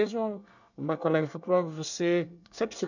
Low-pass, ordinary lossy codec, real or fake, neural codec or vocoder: 7.2 kHz; none; fake; codec, 24 kHz, 1 kbps, SNAC